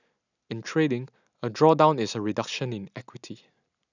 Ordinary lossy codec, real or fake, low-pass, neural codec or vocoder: none; real; 7.2 kHz; none